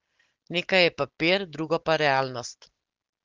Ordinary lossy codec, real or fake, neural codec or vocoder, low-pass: Opus, 16 kbps; real; none; 7.2 kHz